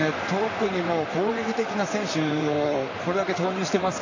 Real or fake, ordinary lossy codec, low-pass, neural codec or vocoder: fake; AAC, 48 kbps; 7.2 kHz; vocoder, 44.1 kHz, 128 mel bands, Pupu-Vocoder